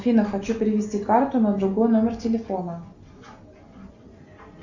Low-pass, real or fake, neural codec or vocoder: 7.2 kHz; real; none